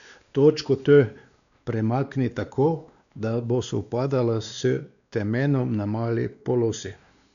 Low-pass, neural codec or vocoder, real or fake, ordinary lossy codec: 7.2 kHz; codec, 16 kHz, 2 kbps, X-Codec, WavLM features, trained on Multilingual LibriSpeech; fake; none